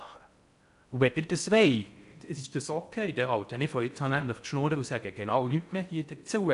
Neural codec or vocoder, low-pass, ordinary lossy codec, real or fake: codec, 16 kHz in and 24 kHz out, 0.6 kbps, FocalCodec, streaming, 4096 codes; 10.8 kHz; none; fake